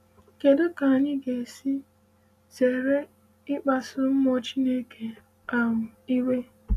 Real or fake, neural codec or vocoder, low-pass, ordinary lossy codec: real; none; 14.4 kHz; none